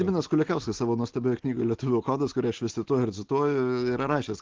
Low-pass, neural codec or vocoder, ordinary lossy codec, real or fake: 7.2 kHz; none; Opus, 16 kbps; real